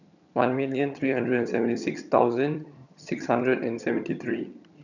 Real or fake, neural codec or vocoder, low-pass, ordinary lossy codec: fake; vocoder, 22.05 kHz, 80 mel bands, HiFi-GAN; 7.2 kHz; none